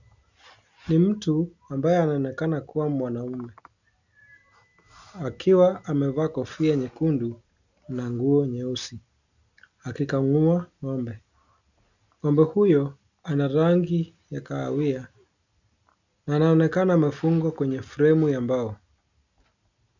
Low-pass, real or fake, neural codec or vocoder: 7.2 kHz; real; none